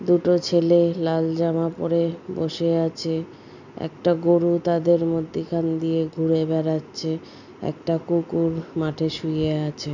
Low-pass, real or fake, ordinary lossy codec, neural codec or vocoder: 7.2 kHz; real; none; none